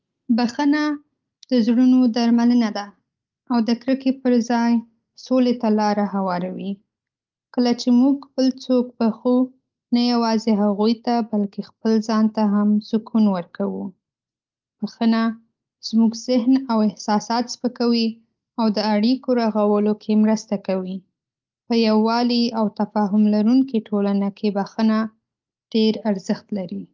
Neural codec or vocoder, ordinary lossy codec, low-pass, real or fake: none; Opus, 32 kbps; 7.2 kHz; real